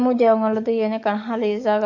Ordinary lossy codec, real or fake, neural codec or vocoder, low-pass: MP3, 48 kbps; fake; codec, 44.1 kHz, 7.8 kbps, DAC; 7.2 kHz